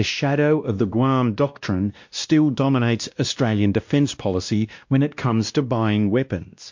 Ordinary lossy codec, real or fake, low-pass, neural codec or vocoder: MP3, 48 kbps; fake; 7.2 kHz; codec, 16 kHz, 1 kbps, X-Codec, WavLM features, trained on Multilingual LibriSpeech